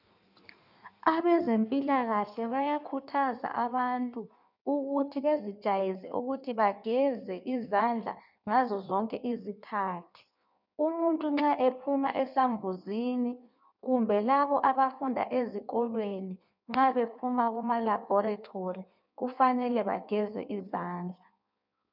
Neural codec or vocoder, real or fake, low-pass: codec, 16 kHz in and 24 kHz out, 1.1 kbps, FireRedTTS-2 codec; fake; 5.4 kHz